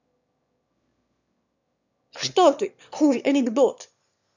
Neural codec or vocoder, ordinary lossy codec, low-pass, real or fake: autoencoder, 22.05 kHz, a latent of 192 numbers a frame, VITS, trained on one speaker; none; 7.2 kHz; fake